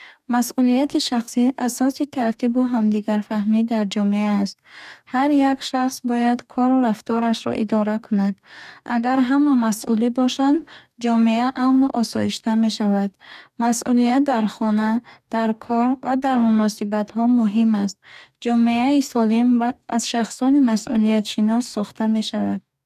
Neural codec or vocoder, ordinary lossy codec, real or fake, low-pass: codec, 44.1 kHz, 2.6 kbps, DAC; none; fake; 14.4 kHz